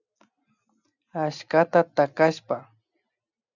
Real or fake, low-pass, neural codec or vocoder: real; 7.2 kHz; none